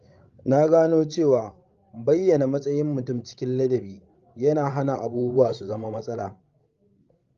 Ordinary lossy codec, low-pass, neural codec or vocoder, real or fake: Opus, 32 kbps; 7.2 kHz; codec, 16 kHz, 16 kbps, FreqCodec, larger model; fake